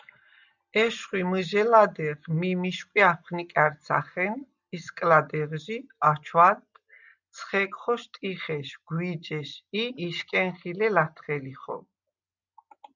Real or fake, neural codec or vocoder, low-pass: real; none; 7.2 kHz